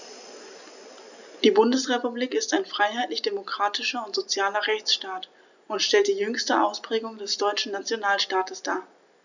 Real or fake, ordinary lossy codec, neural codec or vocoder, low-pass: real; none; none; 7.2 kHz